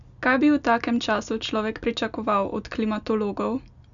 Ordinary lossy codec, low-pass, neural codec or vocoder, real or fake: none; 7.2 kHz; none; real